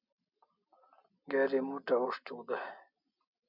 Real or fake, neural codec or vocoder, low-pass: real; none; 5.4 kHz